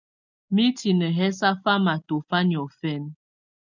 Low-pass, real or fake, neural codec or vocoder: 7.2 kHz; real; none